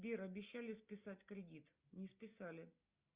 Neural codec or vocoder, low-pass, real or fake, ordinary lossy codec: none; 3.6 kHz; real; Opus, 64 kbps